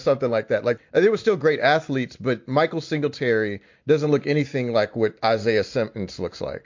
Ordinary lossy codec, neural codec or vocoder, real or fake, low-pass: MP3, 48 kbps; none; real; 7.2 kHz